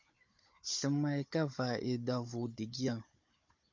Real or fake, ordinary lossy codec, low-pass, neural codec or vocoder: fake; MP3, 48 kbps; 7.2 kHz; codec, 16 kHz, 16 kbps, FunCodec, trained on Chinese and English, 50 frames a second